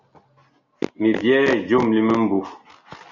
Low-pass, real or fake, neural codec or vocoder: 7.2 kHz; real; none